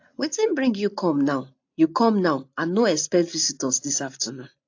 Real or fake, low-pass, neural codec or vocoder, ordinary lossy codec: real; 7.2 kHz; none; AAC, 48 kbps